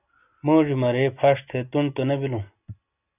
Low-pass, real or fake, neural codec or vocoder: 3.6 kHz; real; none